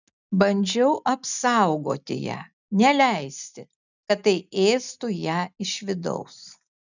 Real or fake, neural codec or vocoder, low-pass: real; none; 7.2 kHz